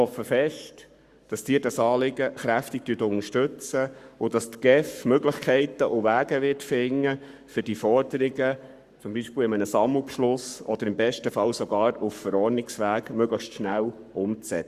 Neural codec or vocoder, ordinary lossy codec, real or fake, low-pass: codec, 44.1 kHz, 7.8 kbps, Pupu-Codec; Opus, 64 kbps; fake; 14.4 kHz